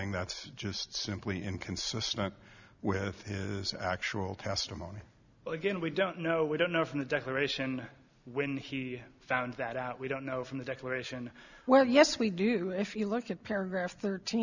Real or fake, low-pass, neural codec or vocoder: real; 7.2 kHz; none